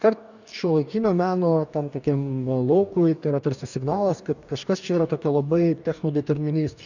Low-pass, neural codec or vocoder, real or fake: 7.2 kHz; codec, 44.1 kHz, 2.6 kbps, DAC; fake